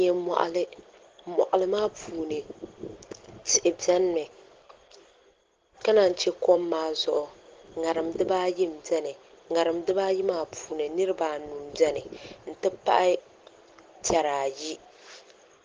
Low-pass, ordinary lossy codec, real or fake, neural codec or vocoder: 7.2 kHz; Opus, 16 kbps; real; none